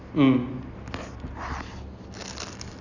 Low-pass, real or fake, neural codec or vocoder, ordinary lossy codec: 7.2 kHz; real; none; none